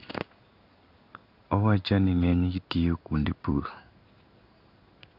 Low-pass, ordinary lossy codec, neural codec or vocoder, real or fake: 5.4 kHz; none; codec, 16 kHz in and 24 kHz out, 1 kbps, XY-Tokenizer; fake